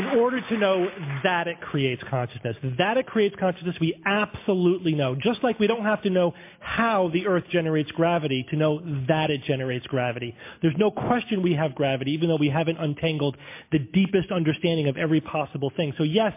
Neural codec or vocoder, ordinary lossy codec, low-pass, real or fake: none; MP3, 24 kbps; 3.6 kHz; real